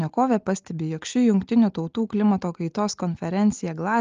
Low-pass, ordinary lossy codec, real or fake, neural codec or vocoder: 7.2 kHz; Opus, 32 kbps; real; none